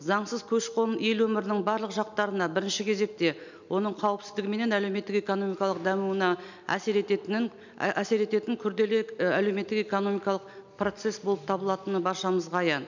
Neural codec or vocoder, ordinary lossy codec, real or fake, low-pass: none; none; real; 7.2 kHz